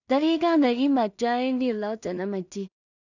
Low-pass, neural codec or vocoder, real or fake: 7.2 kHz; codec, 16 kHz in and 24 kHz out, 0.4 kbps, LongCat-Audio-Codec, two codebook decoder; fake